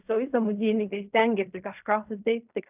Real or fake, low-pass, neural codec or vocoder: fake; 3.6 kHz; codec, 16 kHz in and 24 kHz out, 0.4 kbps, LongCat-Audio-Codec, fine tuned four codebook decoder